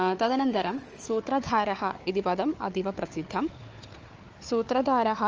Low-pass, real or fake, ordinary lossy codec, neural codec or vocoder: 7.2 kHz; fake; Opus, 24 kbps; codec, 16 kHz, 4 kbps, FunCodec, trained on Chinese and English, 50 frames a second